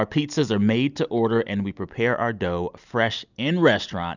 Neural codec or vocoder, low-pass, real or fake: none; 7.2 kHz; real